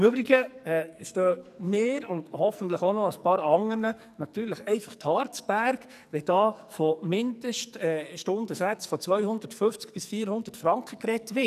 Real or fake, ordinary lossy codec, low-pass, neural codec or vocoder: fake; none; 14.4 kHz; codec, 44.1 kHz, 2.6 kbps, SNAC